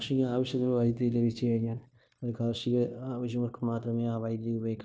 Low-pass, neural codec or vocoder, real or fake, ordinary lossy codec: none; codec, 16 kHz, 0.9 kbps, LongCat-Audio-Codec; fake; none